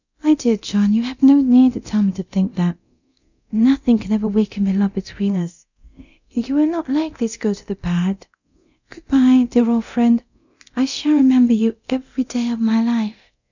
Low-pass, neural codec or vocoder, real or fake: 7.2 kHz; codec, 24 kHz, 0.9 kbps, DualCodec; fake